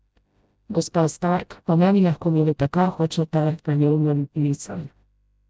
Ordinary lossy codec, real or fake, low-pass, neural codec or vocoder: none; fake; none; codec, 16 kHz, 0.5 kbps, FreqCodec, smaller model